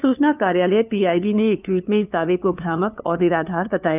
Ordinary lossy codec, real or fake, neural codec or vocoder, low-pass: none; fake; codec, 16 kHz, 2 kbps, FunCodec, trained on LibriTTS, 25 frames a second; 3.6 kHz